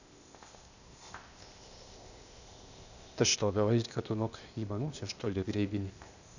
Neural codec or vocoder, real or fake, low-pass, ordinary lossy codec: codec, 16 kHz, 0.8 kbps, ZipCodec; fake; 7.2 kHz; none